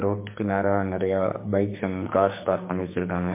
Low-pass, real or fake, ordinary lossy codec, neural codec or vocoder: 3.6 kHz; fake; MP3, 24 kbps; codec, 44.1 kHz, 2.6 kbps, SNAC